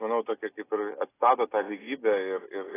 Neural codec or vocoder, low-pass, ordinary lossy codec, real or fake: none; 3.6 kHz; AAC, 16 kbps; real